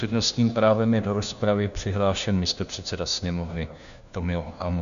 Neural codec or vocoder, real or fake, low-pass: codec, 16 kHz, 1 kbps, FunCodec, trained on LibriTTS, 50 frames a second; fake; 7.2 kHz